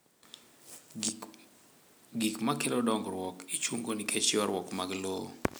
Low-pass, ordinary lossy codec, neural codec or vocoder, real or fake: none; none; none; real